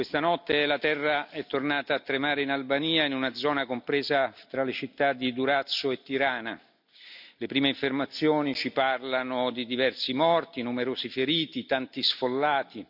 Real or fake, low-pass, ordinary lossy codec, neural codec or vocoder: real; 5.4 kHz; none; none